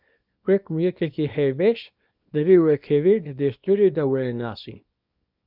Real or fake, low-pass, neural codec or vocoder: fake; 5.4 kHz; codec, 24 kHz, 0.9 kbps, WavTokenizer, small release